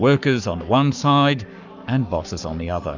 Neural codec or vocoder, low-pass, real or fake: codec, 44.1 kHz, 7.8 kbps, Pupu-Codec; 7.2 kHz; fake